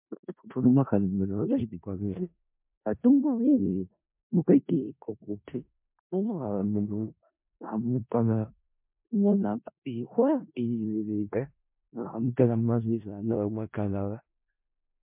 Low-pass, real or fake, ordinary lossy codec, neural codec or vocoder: 3.6 kHz; fake; MP3, 32 kbps; codec, 16 kHz in and 24 kHz out, 0.4 kbps, LongCat-Audio-Codec, four codebook decoder